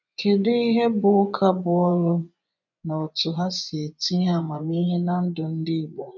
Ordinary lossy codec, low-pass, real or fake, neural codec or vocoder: none; 7.2 kHz; fake; vocoder, 24 kHz, 100 mel bands, Vocos